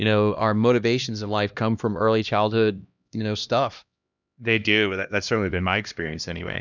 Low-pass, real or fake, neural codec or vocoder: 7.2 kHz; fake; codec, 16 kHz, 1 kbps, X-Codec, HuBERT features, trained on LibriSpeech